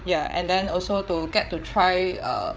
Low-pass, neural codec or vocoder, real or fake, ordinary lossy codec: none; codec, 16 kHz, 8 kbps, FreqCodec, larger model; fake; none